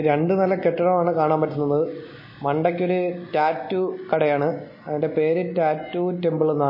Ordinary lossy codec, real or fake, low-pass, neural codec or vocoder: MP3, 24 kbps; real; 5.4 kHz; none